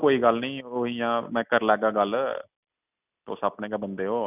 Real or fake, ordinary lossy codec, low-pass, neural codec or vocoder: real; none; 3.6 kHz; none